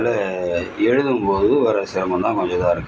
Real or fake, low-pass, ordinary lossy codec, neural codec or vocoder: real; none; none; none